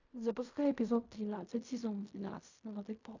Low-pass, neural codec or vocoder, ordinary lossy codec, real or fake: 7.2 kHz; codec, 16 kHz in and 24 kHz out, 0.4 kbps, LongCat-Audio-Codec, fine tuned four codebook decoder; AAC, 48 kbps; fake